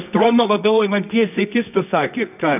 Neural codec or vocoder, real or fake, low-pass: codec, 24 kHz, 0.9 kbps, WavTokenizer, medium music audio release; fake; 3.6 kHz